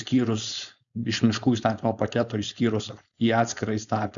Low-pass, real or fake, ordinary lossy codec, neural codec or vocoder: 7.2 kHz; fake; MP3, 96 kbps; codec, 16 kHz, 4.8 kbps, FACodec